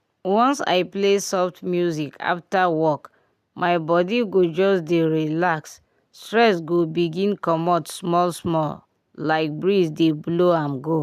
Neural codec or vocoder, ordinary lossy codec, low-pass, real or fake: none; none; 14.4 kHz; real